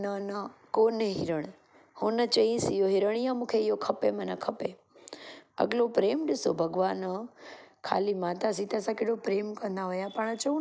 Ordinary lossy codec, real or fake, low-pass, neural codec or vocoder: none; real; none; none